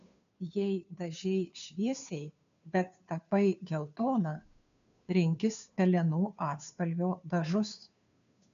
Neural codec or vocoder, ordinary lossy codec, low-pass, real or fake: codec, 16 kHz, 2 kbps, FunCodec, trained on Chinese and English, 25 frames a second; MP3, 96 kbps; 7.2 kHz; fake